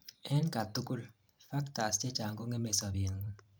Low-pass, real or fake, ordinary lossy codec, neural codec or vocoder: none; fake; none; vocoder, 44.1 kHz, 128 mel bands every 512 samples, BigVGAN v2